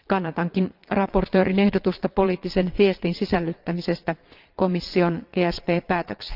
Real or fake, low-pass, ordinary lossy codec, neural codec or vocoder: fake; 5.4 kHz; Opus, 24 kbps; vocoder, 22.05 kHz, 80 mel bands, Vocos